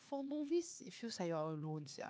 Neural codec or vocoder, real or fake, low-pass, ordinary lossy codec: codec, 16 kHz, 4 kbps, X-Codec, HuBERT features, trained on LibriSpeech; fake; none; none